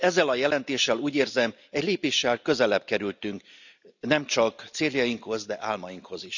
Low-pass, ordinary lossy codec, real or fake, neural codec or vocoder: 7.2 kHz; none; real; none